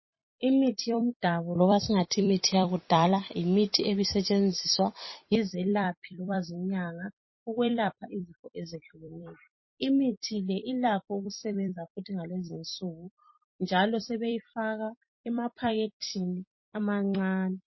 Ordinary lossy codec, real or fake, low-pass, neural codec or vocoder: MP3, 24 kbps; fake; 7.2 kHz; vocoder, 44.1 kHz, 128 mel bands every 256 samples, BigVGAN v2